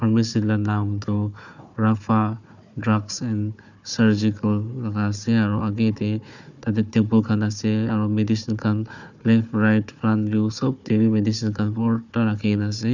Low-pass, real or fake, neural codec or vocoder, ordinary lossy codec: 7.2 kHz; fake; codec, 16 kHz, 4 kbps, FunCodec, trained on Chinese and English, 50 frames a second; none